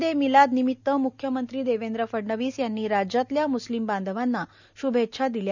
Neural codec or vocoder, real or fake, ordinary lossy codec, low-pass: none; real; none; 7.2 kHz